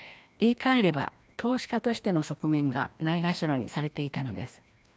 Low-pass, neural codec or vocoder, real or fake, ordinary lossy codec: none; codec, 16 kHz, 1 kbps, FreqCodec, larger model; fake; none